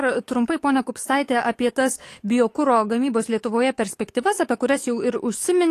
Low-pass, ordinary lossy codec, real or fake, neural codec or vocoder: 14.4 kHz; AAC, 48 kbps; fake; codec, 44.1 kHz, 7.8 kbps, DAC